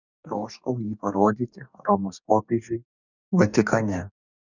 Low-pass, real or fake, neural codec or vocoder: 7.2 kHz; fake; codec, 44.1 kHz, 2.6 kbps, DAC